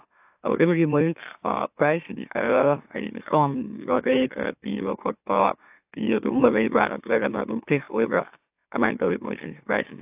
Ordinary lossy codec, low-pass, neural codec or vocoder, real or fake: AAC, 32 kbps; 3.6 kHz; autoencoder, 44.1 kHz, a latent of 192 numbers a frame, MeloTTS; fake